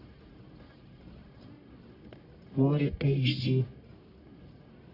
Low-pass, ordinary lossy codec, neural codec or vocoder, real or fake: 5.4 kHz; Opus, 64 kbps; codec, 44.1 kHz, 1.7 kbps, Pupu-Codec; fake